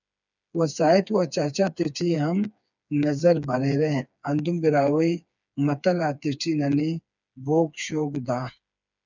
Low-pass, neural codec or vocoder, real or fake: 7.2 kHz; codec, 16 kHz, 4 kbps, FreqCodec, smaller model; fake